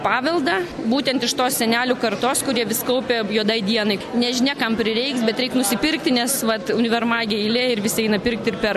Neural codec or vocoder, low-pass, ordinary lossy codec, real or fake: none; 14.4 kHz; AAC, 96 kbps; real